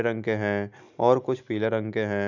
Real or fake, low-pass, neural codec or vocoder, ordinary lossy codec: real; 7.2 kHz; none; none